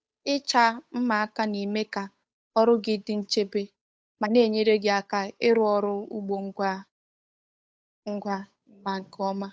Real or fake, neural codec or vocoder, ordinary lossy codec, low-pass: fake; codec, 16 kHz, 8 kbps, FunCodec, trained on Chinese and English, 25 frames a second; none; none